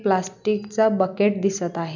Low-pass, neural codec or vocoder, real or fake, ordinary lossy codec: 7.2 kHz; none; real; none